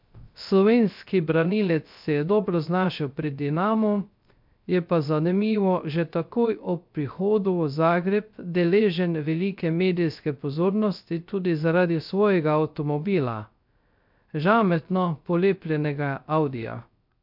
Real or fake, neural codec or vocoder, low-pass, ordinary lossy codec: fake; codec, 16 kHz, 0.3 kbps, FocalCodec; 5.4 kHz; MP3, 48 kbps